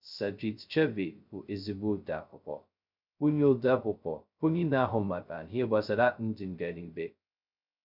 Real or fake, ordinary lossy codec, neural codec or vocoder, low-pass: fake; none; codec, 16 kHz, 0.2 kbps, FocalCodec; 5.4 kHz